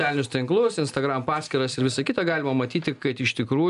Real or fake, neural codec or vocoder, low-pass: real; none; 10.8 kHz